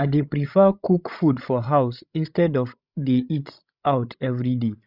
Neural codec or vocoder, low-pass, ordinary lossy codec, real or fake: codec, 16 kHz, 16 kbps, FreqCodec, larger model; 5.4 kHz; none; fake